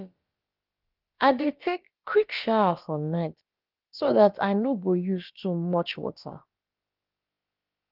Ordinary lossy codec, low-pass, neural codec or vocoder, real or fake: Opus, 32 kbps; 5.4 kHz; codec, 16 kHz, about 1 kbps, DyCAST, with the encoder's durations; fake